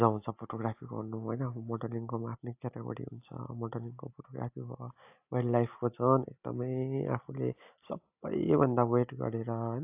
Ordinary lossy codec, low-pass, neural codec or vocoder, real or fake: AAC, 32 kbps; 3.6 kHz; none; real